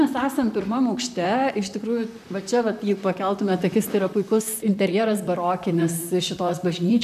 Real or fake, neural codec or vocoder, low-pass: fake; vocoder, 44.1 kHz, 128 mel bands, Pupu-Vocoder; 14.4 kHz